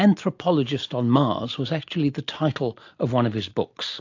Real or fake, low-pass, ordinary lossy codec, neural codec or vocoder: real; 7.2 kHz; AAC, 48 kbps; none